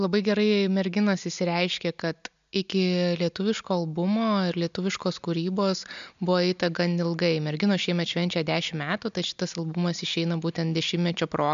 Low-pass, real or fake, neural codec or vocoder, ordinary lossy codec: 7.2 kHz; real; none; MP3, 64 kbps